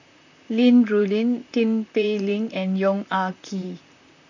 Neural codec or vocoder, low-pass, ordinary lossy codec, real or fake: vocoder, 44.1 kHz, 128 mel bands, Pupu-Vocoder; 7.2 kHz; none; fake